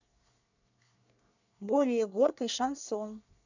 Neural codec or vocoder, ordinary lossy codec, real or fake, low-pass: codec, 24 kHz, 1 kbps, SNAC; none; fake; 7.2 kHz